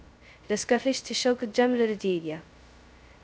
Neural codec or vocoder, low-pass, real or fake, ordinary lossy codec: codec, 16 kHz, 0.2 kbps, FocalCodec; none; fake; none